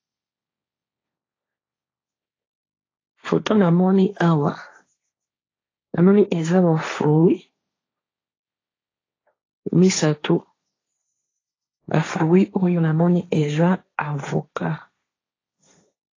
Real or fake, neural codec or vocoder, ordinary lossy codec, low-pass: fake; codec, 16 kHz, 1.1 kbps, Voila-Tokenizer; AAC, 32 kbps; 7.2 kHz